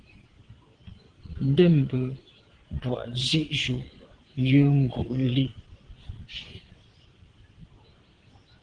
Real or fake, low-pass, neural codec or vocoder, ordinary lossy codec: fake; 9.9 kHz; vocoder, 22.05 kHz, 80 mel bands, Vocos; Opus, 16 kbps